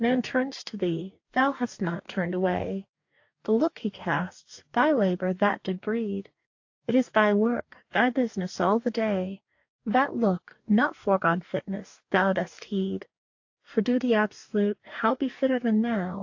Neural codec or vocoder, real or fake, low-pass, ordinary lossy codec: codec, 44.1 kHz, 2.6 kbps, DAC; fake; 7.2 kHz; AAC, 48 kbps